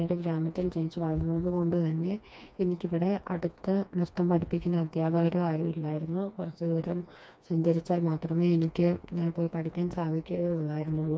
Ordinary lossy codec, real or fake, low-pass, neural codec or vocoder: none; fake; none; codec, 16 kHz, 2 kbps, FreqCodec, smaller model